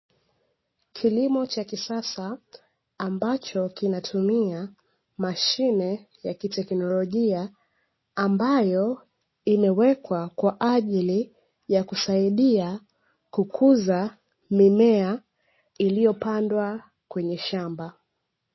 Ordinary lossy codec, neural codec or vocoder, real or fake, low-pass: MP3, 24 kbps; none; real; 7.2 kHz